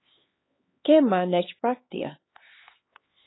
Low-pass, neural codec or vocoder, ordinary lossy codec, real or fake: 7.2 kHz; codec, 16 kHz, 4 kbps, X-Codec, HuBERT features, trained on LibriSpeech; AAC, 16 kbps; fake